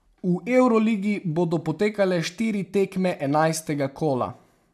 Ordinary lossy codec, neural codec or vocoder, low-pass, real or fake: none; none; 14.4 kHz; real